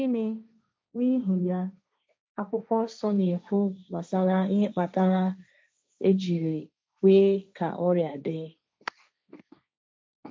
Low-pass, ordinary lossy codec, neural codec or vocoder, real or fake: none; none; codec, 16 kHz, 1.1 kbps, Voila-Tokenizer; fake